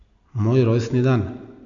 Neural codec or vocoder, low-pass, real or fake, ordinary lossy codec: none; 7.2 kHz; real; MP3, 64 kbps